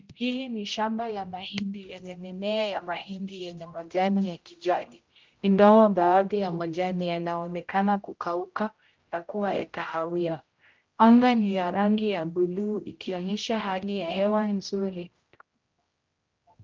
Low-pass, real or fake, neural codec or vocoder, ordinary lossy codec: 7.2 kHz; fake; codec, 16 kHz, 0.5 kbps, X-Codec, HuBERT features, trained on general audio; Opus, 32 kbps